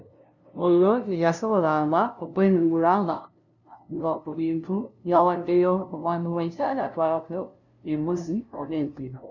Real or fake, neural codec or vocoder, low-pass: fake; codec, 16 kHz, 0.5 kbps, FunCodec, trained on LibriTTS, 25 frames a second; 7.2 kHz